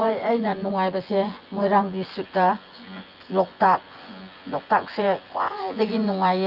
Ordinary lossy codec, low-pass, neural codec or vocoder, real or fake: Opus, 24 kbps; 5.4 kHz; vocoder, 24 kHz, 100 mel bands, Vocos; fake